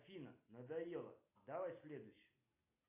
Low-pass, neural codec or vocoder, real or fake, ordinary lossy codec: 3.6 kHz; none; real; AAC, 32 kbps